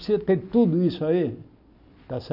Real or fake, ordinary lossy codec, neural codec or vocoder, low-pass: real; none; none; 5.4 kHz